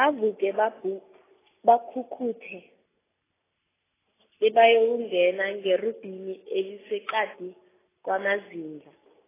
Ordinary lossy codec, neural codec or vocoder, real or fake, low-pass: AAC, 16 kbps; none; real; 3.6 kHz